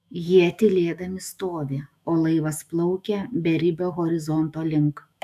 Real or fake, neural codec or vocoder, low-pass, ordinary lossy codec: fake; autoencoder, 48 kHz, 128 numbers a frame, DAC-VAE, trained on Japanese speech; 14.4 kHz; AAC, 96 kbps